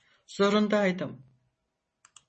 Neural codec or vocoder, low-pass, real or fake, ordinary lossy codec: none; 10.8 kHz; real; MP3, 32 kbps